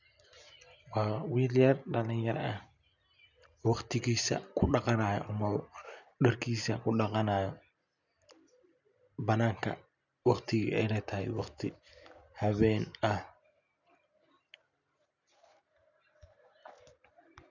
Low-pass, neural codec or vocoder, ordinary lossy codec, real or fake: 7.2 kHz; none; none; real